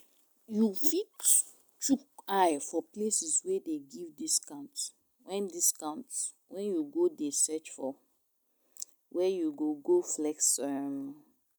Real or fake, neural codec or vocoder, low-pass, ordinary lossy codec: real; none; none; none